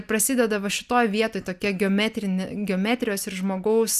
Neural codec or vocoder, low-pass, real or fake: none; 14.4 kHz; real